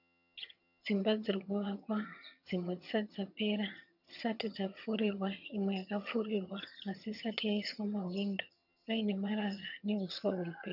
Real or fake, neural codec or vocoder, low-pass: fake; vocoder, 22.05 kHz, 80 mel bands, HiFi-GAN; 5.4 kHz